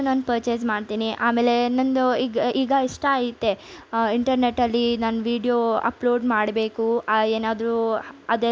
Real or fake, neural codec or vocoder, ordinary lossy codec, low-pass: real; none; none; none